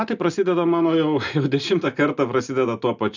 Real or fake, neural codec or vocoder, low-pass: real; none; 7.2 kHz